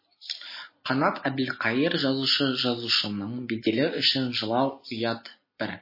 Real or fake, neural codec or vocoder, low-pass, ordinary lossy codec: real; none; 5.4 kHz; MP3, 24 kbps